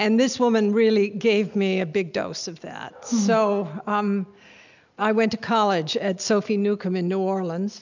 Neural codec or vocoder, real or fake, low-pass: none; real; 7.2 kHz